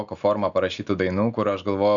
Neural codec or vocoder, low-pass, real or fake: none; 7.2 kHz; real